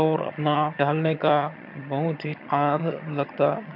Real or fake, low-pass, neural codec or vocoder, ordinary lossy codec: fake; 5.4 kHz; vocoder, 22.05 kHz, 80 mel bands, HiFi-GAN; MP3, 48 kbps